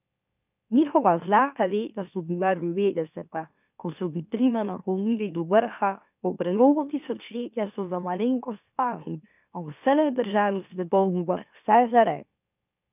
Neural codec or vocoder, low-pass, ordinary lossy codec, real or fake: autoencoder, 44.1 kHz, a latent of 192 numbers a frame, MeloTTS; 3.6 kHz; none; fake